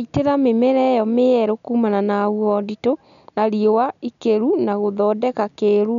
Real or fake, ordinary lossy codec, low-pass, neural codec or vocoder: real; none; 7.2 kHz; none